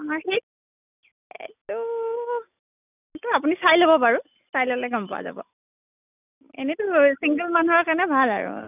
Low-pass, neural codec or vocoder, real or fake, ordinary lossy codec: 3.6 kHz; none; real; none